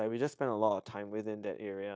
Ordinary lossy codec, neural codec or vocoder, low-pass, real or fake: none; codec, 16 kHz, 0.9 kbps, LongCat-Audio-Codec; none; fake